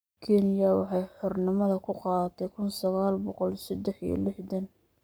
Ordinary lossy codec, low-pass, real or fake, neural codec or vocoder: none; none; fake; codec, 44.1 kHz, 7.8 kbps, Pupu-Codec